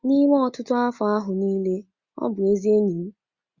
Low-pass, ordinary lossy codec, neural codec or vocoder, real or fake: 7.2 kHz; Opus, 64 kbps; none; real